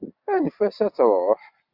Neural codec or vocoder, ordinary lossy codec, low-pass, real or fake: none; Opus, 64 kbps; 5.4 kHz; real